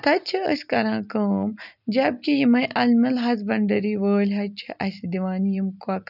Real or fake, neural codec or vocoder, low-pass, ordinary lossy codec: real; none; 5.4 kHz; none